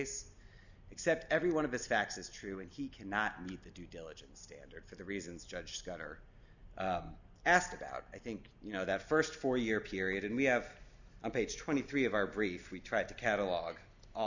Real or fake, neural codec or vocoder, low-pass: real; none; 7.2 kHz